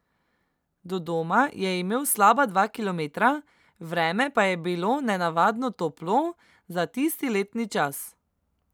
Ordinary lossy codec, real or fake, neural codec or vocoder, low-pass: none; real; none; none